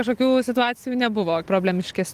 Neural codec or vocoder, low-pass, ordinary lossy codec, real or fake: none; 14.4 kHz; Opus, 24 kbps; real